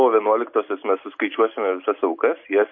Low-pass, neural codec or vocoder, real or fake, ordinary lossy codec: 7.2 kHz; none; real; MP3, 32 kbps